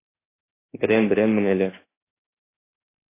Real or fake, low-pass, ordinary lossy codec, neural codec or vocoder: fake; 3.6 kHz; AAC, 16 kbps; autoencoder, 48 kHz, 32 numbers a frame, DAC-VAE, trained on Japanese speech